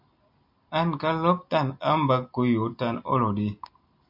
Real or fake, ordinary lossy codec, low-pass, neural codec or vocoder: real; MP3, 48 kbps; 5.4 kHz; none